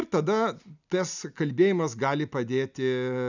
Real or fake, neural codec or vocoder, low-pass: real; none; 7.2 kHz